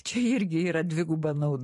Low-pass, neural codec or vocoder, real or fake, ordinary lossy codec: 14.4 kHz; vocoder, 44.1 kHz, 128 mel bands every 512 samples, BigVGAN v2; fake; MP3, 48 kbps